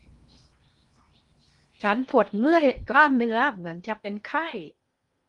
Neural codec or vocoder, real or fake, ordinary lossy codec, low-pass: codec, 16 kHz in and 24 kHz out, 0.8 kbps, FocalCodec, streaming, 65536 codes; fake; Opus, 32 kbps; 10.8 kHz